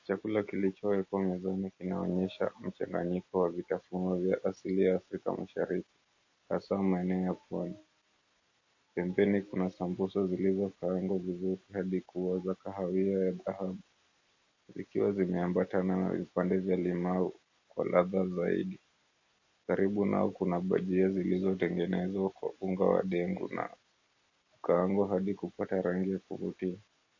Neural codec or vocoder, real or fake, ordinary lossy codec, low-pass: none; real; MP3, 32 kbps; 7.2 kHz